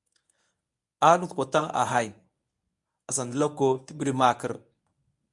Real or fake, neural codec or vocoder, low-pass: fake; codec, 24 kHz, 0.9 kbps, WavTokenizer, medium speech release version 1; 10.8 kHz